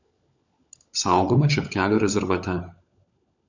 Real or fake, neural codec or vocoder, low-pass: fake; codec, 16 kHz, 16 kbps, FunCodec, trained on LibriTTS, 50 frames a second; 7.2 kHz